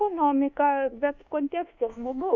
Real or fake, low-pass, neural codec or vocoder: fake; 7.2 kHz; codec, 24 kHz, 1.2 kbps, DualCodec